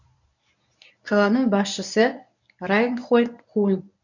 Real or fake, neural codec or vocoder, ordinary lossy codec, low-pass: fake; codec, 24 kHz, 0.9 kbps, WavTokenizer, medium speech release version 1; none; 7.2 kHz